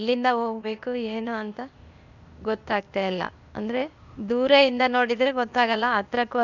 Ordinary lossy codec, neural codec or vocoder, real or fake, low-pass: none; codec, 16 kHz, 0.8 kbps, ZipCodec; fake; 7.2 kHz